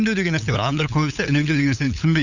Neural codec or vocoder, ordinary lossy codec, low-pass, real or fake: codec, 16 kHz, 8 kbps, FunCodec, trained on LibriTTS, 25 frames a second; none; 7.2 kHz; fake